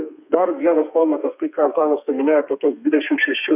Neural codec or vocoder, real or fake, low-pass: codec, 32 kHz, 1.9 kbps, SNAC; fake; 3.6 kHz